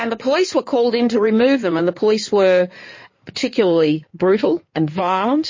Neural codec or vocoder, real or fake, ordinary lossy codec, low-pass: codec, 16 kHz in and 24 kHz out, 2.2 kbps, FireRedTTS-2 codec; fake; MP3, 32 kbps; 7.2 kHz